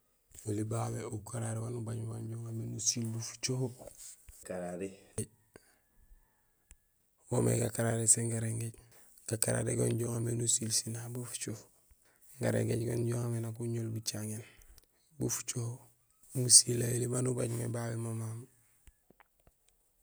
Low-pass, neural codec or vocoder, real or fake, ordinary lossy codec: none; none; real; none